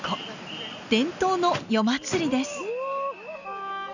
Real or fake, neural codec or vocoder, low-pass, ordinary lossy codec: real; none; 7.2 kHz; none